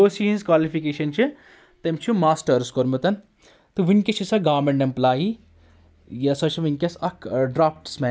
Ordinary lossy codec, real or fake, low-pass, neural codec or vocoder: none; real; none; none